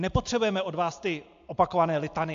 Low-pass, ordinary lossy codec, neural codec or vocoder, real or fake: 7.2 kHz; AAC, 64 kbps; none; real